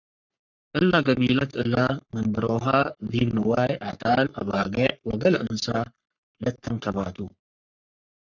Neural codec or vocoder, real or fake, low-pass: codec, 44.1 kHz, 3.4 kbps, Pupu-Codec; fake; 7.2 kHz